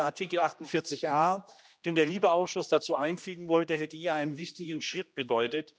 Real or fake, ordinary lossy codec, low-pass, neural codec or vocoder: fake; none; none; codec, 16 kHz, 1 kbps, X-Codec, HuBERT features, trained on general audio